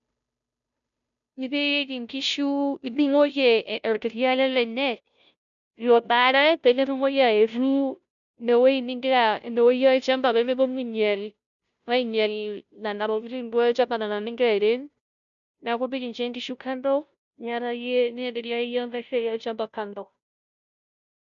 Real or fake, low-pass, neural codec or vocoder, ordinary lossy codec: fake; 7.2 kHz; codec, 16 kHz, 0.5 kbps, FunCodec, trained on Chinese and English, 25 frames a second; none